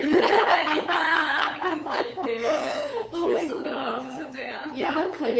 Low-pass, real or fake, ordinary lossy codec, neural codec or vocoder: none; fake; none; codec, 16 kHz, 8 kbps, FunCodec, trained on LibriTTS, 25 frames a second